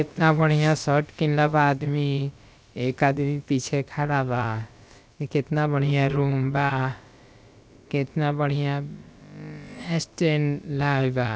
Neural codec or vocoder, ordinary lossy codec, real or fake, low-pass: codec, 16 kHz, about 1 kbps, DyCAST, with the encoder's durations; none; fake; none